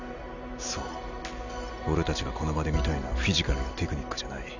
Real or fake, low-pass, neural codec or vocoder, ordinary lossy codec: real; 7.2 kHz; none; none